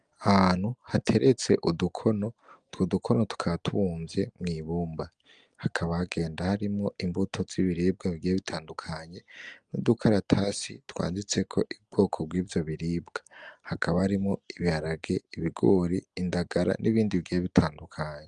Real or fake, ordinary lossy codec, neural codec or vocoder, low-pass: real; Opus, 32 kbps; none; 9.9 kHz